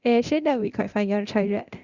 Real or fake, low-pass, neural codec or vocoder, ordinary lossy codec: fake; 7.2 kHz; codec, 24 kHz, 0.9 kbps, DualCodec; Opus, 64 kbps